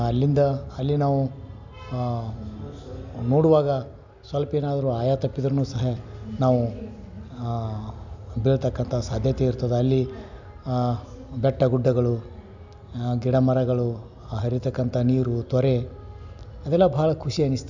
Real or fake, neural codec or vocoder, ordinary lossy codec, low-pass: real; none; none; 7.2 kHz